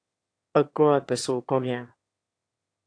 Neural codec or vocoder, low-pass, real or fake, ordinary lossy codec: autoencoder, 22.05 kHz, a latent of 192 numbers a frame, VITS, trained on one speaker; 9.9 kHz; fake; AAC, 48 kbps